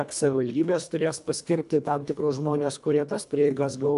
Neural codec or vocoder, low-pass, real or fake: codec, 24 kHz, 1.5 kbps, HILCodec; 10.8 kHz; fake